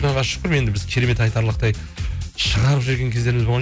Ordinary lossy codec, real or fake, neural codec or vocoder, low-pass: none; real; none; none